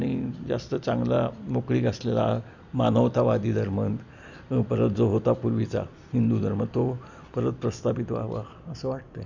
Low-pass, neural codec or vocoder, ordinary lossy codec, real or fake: 7.2 kHz; none; none; real